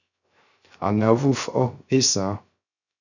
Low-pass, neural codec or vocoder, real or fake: 7.2 kHz; codec, 16 kHz, 0.3 kbps, FocalCodec; fake